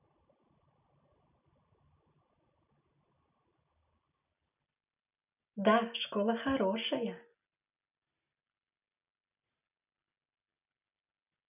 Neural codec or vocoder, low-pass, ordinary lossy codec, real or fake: none; 3.6 kHz; none; real